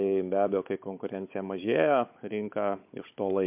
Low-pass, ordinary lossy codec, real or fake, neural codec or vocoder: 3.6 kHz; MP3, 32 kbps; fake; codec, 16 kHz, 16 kbps, FunCodec, trained on Chinese and English, 50 frames a second